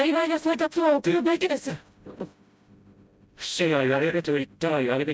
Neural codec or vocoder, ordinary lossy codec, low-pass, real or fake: codec, 16 kHz, 0.5 kbps, FreqCodec, smaller model; none; none; fake